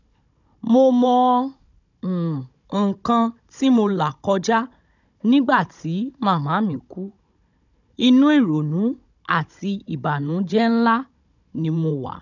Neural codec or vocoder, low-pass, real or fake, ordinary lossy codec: codec, 16 kHz, 16 kbps, FunCodec, trained on Chinese and English, 50 frames a second; 7.2 kHz; fake; none